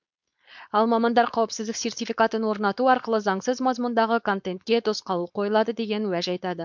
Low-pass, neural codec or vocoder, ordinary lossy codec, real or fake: 7.2 kHz; codec, 16 kHz, 4.8 kbps, FACodec; MP3, 64 kbps; fake